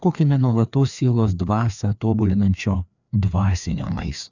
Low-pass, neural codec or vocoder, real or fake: 7.2 kHz; codec, 16 kHz, 2 kbps, FreqCodec, larger model; fake